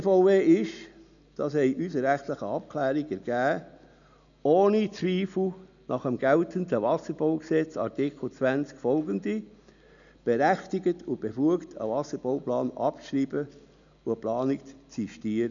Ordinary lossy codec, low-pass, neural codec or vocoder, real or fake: none; 7.2 kHz; none; real